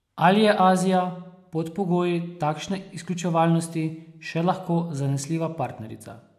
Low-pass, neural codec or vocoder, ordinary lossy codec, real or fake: 14.4 kHz; none; AAC, 96 kbps; real